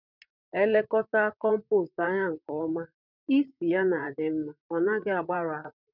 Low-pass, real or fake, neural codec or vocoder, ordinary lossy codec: 5.4 kHz; fake; vocoder, 44.1 kHz, 128 mel bands, Pupu-Vocoder; none